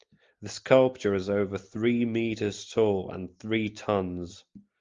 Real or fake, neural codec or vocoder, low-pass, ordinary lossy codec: real; none; 7.2 kHz; Opus, 32 kbps